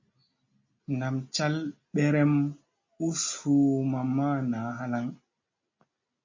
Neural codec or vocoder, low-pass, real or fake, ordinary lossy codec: none; 7.2 kHz; real; MP3, 32 kbps